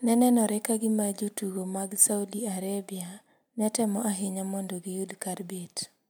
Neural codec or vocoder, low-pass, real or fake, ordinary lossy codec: none; none; real; none